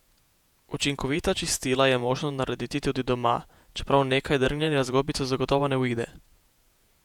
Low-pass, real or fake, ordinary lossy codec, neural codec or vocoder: 19.8 kHz; fake; none; vocoder, 48 kHz, 128 mel bands, Vocos